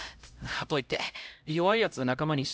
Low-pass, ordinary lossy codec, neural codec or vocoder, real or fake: none; none; codec, 16 kHz, 0.5 kbps, X-Codec, HuBERT features, trained on LibriSpeech; fake